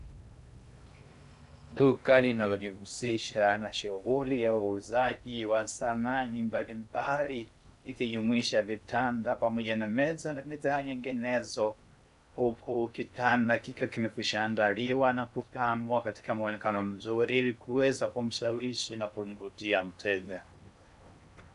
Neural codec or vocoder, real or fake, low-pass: codec, 16 kHz in and 24 kHz out, 0.6 kbps, FocalCodec, streaming, 2048 codes; fake; 10.8 kHz